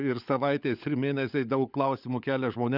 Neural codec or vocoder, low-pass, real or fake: codec, 16 kHz, 16 kbps, FunCodec, trained on LibriTTS, 50 frames a second; 5.4 kHz; fake